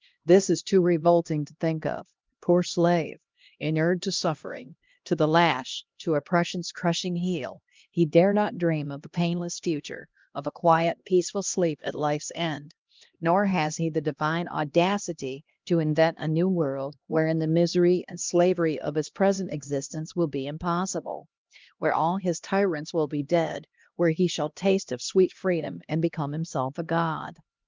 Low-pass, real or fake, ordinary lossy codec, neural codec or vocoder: 7.2 kHz; fake; Opus, 32 kbps; codec, 16 kHz, 1 kbps, X-Codec, HuBERT features, trained on LibriSpeech